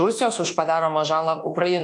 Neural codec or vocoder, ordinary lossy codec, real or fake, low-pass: codec, 24 kHz, 1.2 kbps, DualCodec; AAC, 48 kbps; fake; 10.8 kHz